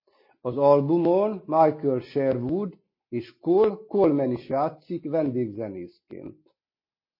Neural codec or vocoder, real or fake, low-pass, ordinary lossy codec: none; real; 5.4 kHz; MP3, 24 kbps